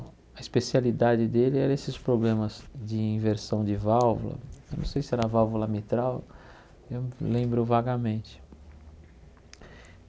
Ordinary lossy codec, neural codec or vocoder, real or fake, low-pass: none; none; real; none